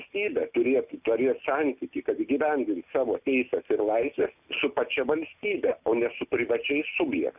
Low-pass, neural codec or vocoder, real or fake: 3.6 kHz; none; real